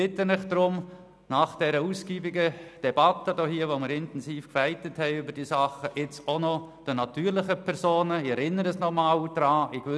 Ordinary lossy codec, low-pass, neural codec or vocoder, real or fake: none; none; none; real